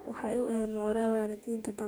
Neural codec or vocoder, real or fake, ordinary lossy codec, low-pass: codec, 44.1 kHz, 2.6 kbps, DAC; fake; none; none